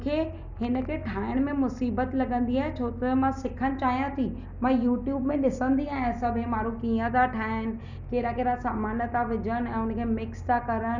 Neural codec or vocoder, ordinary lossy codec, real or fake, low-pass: none; none; real; 7.2 kHz